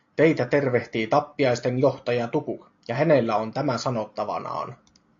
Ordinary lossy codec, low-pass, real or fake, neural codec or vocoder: AAC, 48 kbps; 7.2 kHz; real; none